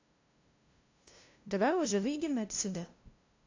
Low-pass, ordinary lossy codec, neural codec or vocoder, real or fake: 7.2 kHz; AAC, 48 kbps; codec, 16 kHz, 0.5 kbps, FunCodec, trained on LibriTTS, 25 frames a second; fake